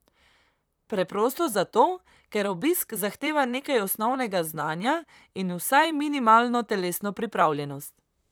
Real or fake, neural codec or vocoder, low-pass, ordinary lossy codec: fake; vocoder, 44.1 kHz, 128 mel bands, Pupu-Vocoder; none; none